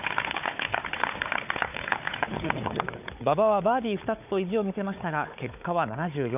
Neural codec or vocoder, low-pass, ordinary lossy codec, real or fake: codec, 16 kHz, 4 kbps, FunCodec, trained on Chinese and English, 50 frames a second; 3.6 kHz; none; fake